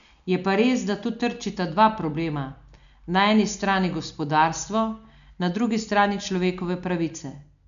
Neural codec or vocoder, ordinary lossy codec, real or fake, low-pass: none; none; real; 7.2 kHz